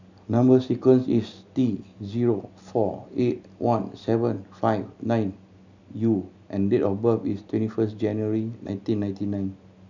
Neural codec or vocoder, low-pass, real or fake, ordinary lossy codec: none; 7.2 kHz; real; none